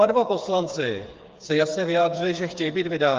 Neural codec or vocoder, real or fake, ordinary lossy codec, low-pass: codec, 16 kHz, 4 kbps, FreqCodec, smaller model; fake; Opus, 24 kbps; 7.2 kHz